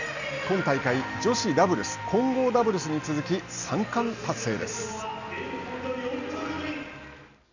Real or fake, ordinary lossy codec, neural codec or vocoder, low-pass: real; none; none; 7.2 kHz